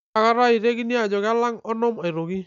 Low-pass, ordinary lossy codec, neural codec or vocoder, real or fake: 7.2 kHz; none; none; real